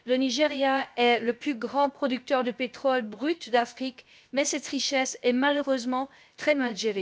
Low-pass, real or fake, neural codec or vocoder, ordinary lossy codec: none; fake; codec, 16 kHz, about 1 kbps, DyCAST, with the encoder's durations; none